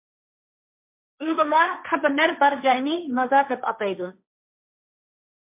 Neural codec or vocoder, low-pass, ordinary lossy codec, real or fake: codec, 16 kHz, 1.1 kbps, Voila-Tokenizer; 3.6 kHz; MP3, 32 kbps; fake